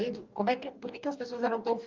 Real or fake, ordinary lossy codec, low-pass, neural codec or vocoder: fake; Opus, 24 kbps; 7.2 kHz; codec, 44.1 kHz, 2.6 kbps, DAC